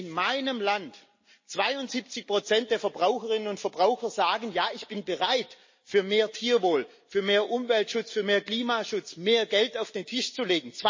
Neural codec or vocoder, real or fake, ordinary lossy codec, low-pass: none; real; MP3, 32 kbps; 7.2 kHz